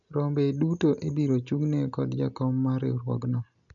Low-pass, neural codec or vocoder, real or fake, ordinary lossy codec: 7.2 kHz; none; real; none